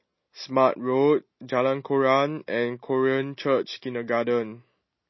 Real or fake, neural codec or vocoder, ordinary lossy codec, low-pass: real; none; MP3, 24 kbps; 7.2 kHz